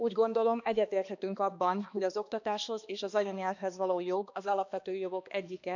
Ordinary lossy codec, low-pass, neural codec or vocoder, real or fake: none; 7.2 kHz; codec, 16 kHz, 2 kbps, X-Codec, HuBERT features, trained on balanced general audio; fake